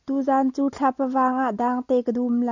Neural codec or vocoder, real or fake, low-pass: none; real; 7.2 kHz